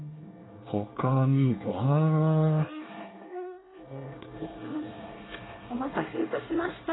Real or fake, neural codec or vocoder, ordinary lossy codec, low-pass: fake; codec, 24 kHz, 1 kbps, SNAC; AAC, 16 kbps; 7.2 kHz